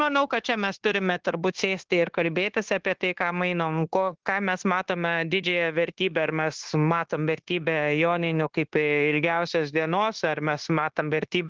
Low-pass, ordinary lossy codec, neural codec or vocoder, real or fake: 7.2 kHz; Opus, 16 kbps; codec, 16 kHz, 0.9 kbps, LongCat-Audio-Codec; fake